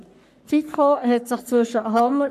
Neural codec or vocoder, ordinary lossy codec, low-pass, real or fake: codec, 44.1 kHz, 3.4 kbps, Pupu-Codec; none; 14.4 kHz; fake